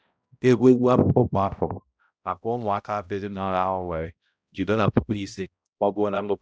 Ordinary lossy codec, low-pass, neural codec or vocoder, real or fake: none; none; codec, 16 kHz, 0.5 kbps, X-Codec, HuBERT features, trained on balanced general audio; fake